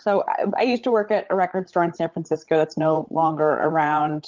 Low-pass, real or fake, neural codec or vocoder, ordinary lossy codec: 7.2 kHz; fake; codec, 16 kHz, 8 kbps, FreqCodec, larger model; Opus, 24 kbps